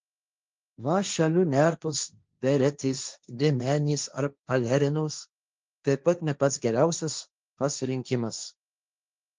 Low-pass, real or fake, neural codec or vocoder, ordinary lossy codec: 7.2 kHz; fake; codec, 16 kHz, 1.1 kbps, Voila-Tokenizer; Opus, 24 kbps